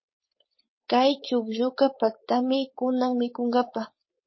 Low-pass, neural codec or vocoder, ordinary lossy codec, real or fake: 7.2 kHz; codec, 16 kHz, 4.8 kbps, FACodec; MP3, 24 kbps; fake